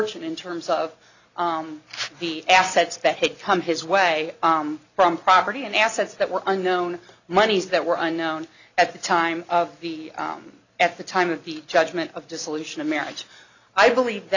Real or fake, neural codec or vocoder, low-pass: real; none; 7.2 kHz